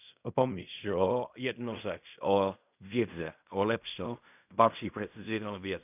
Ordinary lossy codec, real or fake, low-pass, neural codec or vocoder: none; fake; 3.6 kHz; codec, 16 kHz in and 24 kHz out, 0.4 kbps, LongCat-Audio-Codec, fine tuned four codebook decoder